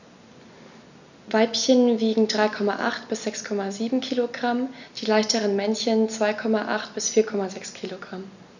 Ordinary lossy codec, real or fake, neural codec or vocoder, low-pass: none; real; none; 7.2 kHz